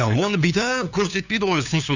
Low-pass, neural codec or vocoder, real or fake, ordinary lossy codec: 7.2 kHz; codec, 16 kHz, 2 kbps, FunCodec, trained on LibriTTS, 25 frames a second; fake; none